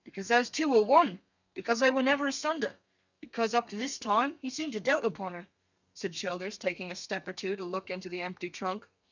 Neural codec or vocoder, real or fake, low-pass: codec, 32 kHz, 1.9 kbps, SNAC; fake; 7.2 kHz